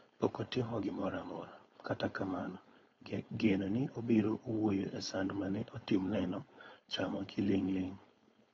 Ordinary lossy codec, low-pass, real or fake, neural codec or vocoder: AAC, 24 kbps; 7.2 kHz; fake; codec, 16 kHz, 4.8 kbps, FACodec